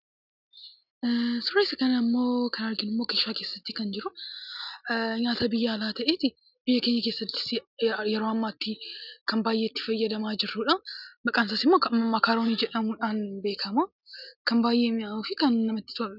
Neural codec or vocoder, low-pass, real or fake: none; 5.4 kHz; real